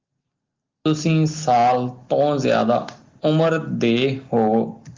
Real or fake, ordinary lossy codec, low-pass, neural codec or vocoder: real; Opus, 32 kbps; 7.2 kHz; none